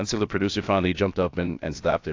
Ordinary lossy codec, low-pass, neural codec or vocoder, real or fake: AAC, 48 kbps; 7.2 kHz; codec, 16 kHz, about 1 kbps, DyCAST, with the encoder's durations; fake